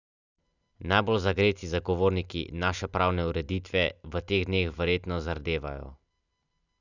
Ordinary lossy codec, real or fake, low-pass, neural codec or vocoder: none; real; 7.2 kHz; none